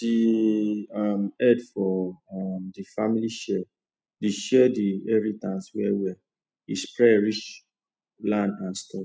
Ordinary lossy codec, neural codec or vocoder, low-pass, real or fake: none; none; none; real